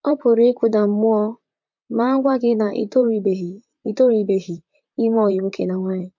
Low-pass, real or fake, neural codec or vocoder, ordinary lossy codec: 7.2 kHz; fake; vocoder, 44.1 kHz, 128 mel bands, Pupu-Vocoder; MP3, 48 kbps